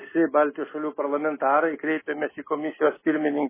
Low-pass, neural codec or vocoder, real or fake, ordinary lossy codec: 3.6 kHz; none; real; MP3, 16 kbps